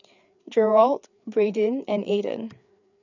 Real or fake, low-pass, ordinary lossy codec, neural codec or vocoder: fake; 7.2 kHz; none; codec, 16 kHz, 4 kbps, FreqCodec, larger model